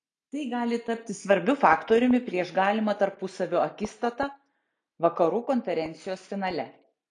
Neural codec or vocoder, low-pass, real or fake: none; 9.9 kHz; real